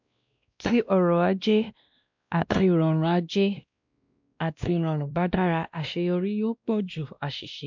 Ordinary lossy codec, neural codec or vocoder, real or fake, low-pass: MP3, 64 kbps; codec, 16 kHz, 1 kbps, X-Codec, WavLM features, trained on Multilingual LibriSpeech; fake; 7.2 kHz